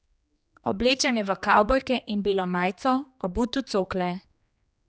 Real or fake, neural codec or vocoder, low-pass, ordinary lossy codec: fake; codec, 16 kHz, 2 kbps, X-Codec, HuBERT features, trained on general audio; none; none